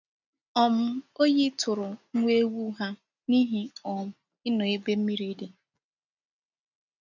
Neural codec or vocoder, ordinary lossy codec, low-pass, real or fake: none; none; 7.2 kHz; real